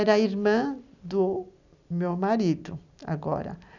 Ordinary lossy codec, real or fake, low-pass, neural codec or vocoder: none; real; 7.2 kHz; none